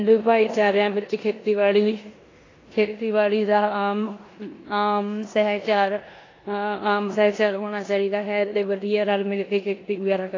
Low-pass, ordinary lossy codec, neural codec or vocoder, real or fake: 7.2 kHz; AAC, 32 kbps; codec, 16 kHz in and 24 kHz out, 0.9 kbps, LongCat-Audio-Codec, four codebook decoder; fake